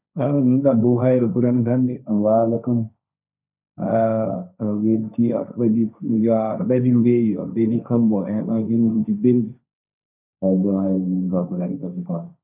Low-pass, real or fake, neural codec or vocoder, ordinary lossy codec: 3.6 kHz; fake; codec, 16 kHz, 1.1 kbps, Voila-Tokenizer; none